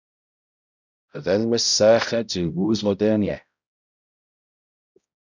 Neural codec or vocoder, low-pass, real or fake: codec, 16 kHz, 0.5 kbps, X-Codec, HuBERT features, trained on balanced general audio; 7.2 kHz; fake